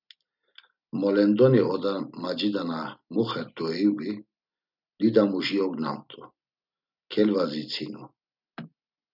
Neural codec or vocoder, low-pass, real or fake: none; 5.4 kHz; real